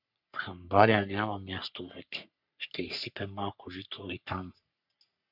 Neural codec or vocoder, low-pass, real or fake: codec, 44.1 kHz, 3.4 kbps, Pupu-Codec; 5.4 kHz; fake